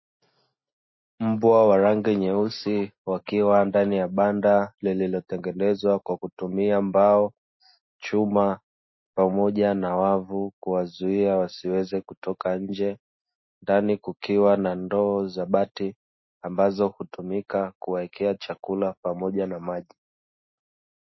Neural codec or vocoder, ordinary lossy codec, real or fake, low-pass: none; MP3, 24 kbps; real; 7.2 kHz